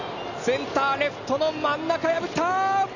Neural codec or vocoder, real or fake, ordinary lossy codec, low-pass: none; real; none; 7.2 kHz